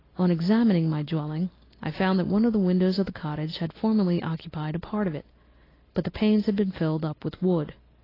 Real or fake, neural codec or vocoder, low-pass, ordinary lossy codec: real; none; 5.4 kHz; AAC, 24 kbps